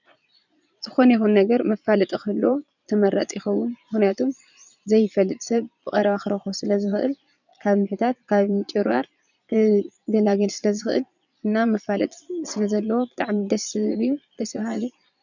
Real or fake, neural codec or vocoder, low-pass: fake; vocoder, 22.05 kHz, 80 mel bands, Vocos; 7.2 kHz